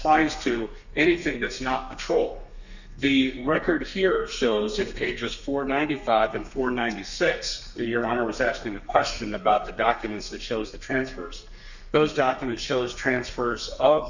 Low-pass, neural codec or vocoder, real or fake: 7.2 kHz; codec, 32 kHz, 1.9 kbps, SNAC; fake